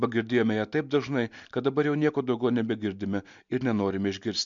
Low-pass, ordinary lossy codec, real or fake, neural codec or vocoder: 7.2 kHz; MP3, 64 kbps; real; none